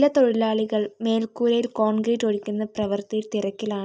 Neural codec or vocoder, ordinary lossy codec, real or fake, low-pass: none; none; real; none